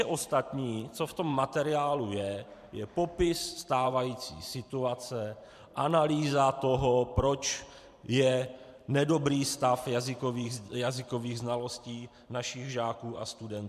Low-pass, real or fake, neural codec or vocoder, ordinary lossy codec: 14.4 kHz; fake; vocoder, 44.1 kHz, 128 mel bands every 512 samples, BigVGAN v2; MP3, 96 kbps